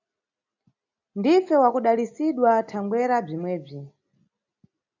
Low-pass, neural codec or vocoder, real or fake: 7.2 kHz; none; real